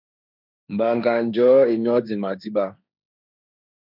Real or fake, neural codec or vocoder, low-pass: fake; codec, 16 kHz, 1.1 kbps, Voila-Tokenizer; 5.4 kHz